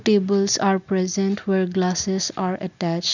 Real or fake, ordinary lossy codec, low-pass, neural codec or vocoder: real; none; 7.2 kHz; none